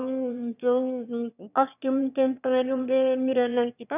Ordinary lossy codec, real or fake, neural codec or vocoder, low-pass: none; fake; autoencoder, 22.05 kHz, a latent of 192 numbers a frame, VITS, trained on one speaker; 3.6 kHz